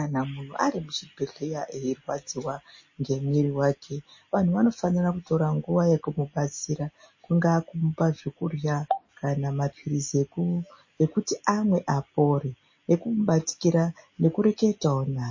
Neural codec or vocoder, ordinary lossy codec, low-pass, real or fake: none; MP3, 32 kbps; 7.2 kHz; real